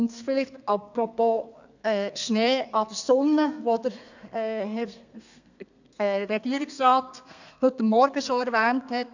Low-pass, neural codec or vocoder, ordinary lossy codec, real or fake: 7.2 kHz; codec, 32 kHz, 1.9 kbps, SNAC; none; fake